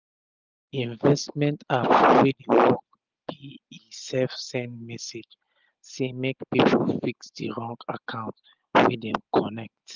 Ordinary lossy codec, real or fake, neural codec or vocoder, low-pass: Opus, 24 kbps; real; none; 7.2 kHz